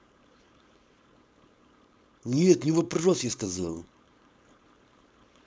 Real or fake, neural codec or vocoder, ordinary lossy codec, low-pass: fake; codec, 16 kHz, 4.8 kbps, FACodec; none; none